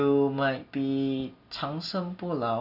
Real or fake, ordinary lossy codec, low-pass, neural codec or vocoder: real; none; 5.4 kHz; none